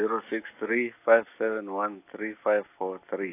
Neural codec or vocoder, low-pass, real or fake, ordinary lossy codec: none; 3.6 kHz; real; AAC, 24 kbps